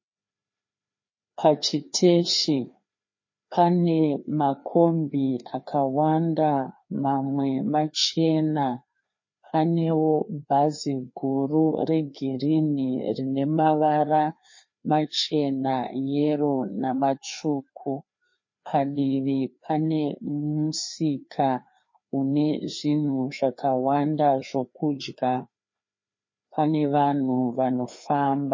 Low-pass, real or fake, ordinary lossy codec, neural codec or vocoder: 7.2 kHz; fake; MP3, 32 kbps; codec, 16 kHz, 2 kbps, FreqCodec, larger model